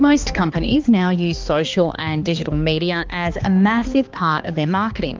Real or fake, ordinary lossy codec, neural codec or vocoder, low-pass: fake; Opus, 32 kbps; codec, 16 kHz, 2 kbps, X-Codec, HuBERT features, trained on balanced general audio; 7.2 kHz